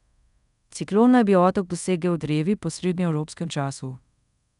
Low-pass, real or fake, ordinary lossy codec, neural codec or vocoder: 10.8 kHz; fake; none; codec, 24 kHz, 0.5 kbps, DualCodec